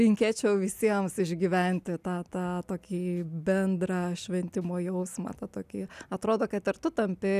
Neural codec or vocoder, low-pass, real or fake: none; 14.4 kHz; real